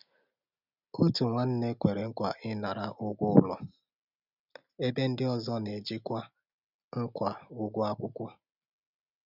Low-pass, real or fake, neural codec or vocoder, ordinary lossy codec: 5.4 kHz; real; none; none